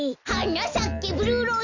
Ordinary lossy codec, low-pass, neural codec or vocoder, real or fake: none; 7.2 kHz; none; real